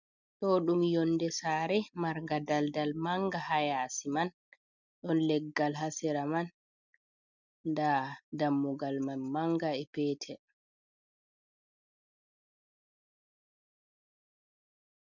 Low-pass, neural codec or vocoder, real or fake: 7.2 kHz; none; real